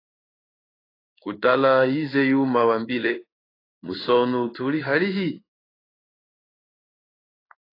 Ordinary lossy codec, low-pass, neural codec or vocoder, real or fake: AAC, 24 kbps; 5.4 kHz; codec, 16 kHz in and 24 kHz out, 1 kbps, XY-Tokenizer; fake